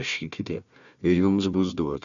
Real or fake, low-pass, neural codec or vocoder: fake; 7.2 kHz; codec, 16 kHz, 1 kbps, FunCodec, trained on Chinese and English, 50 frames a second